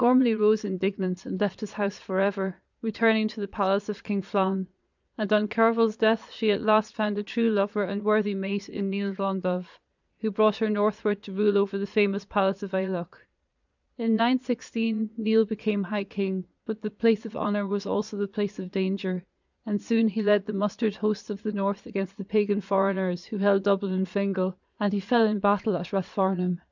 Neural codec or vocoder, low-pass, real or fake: vocoder, 22.05 kHz, 80 mel bands, Vocos; 7.2 kHz; fake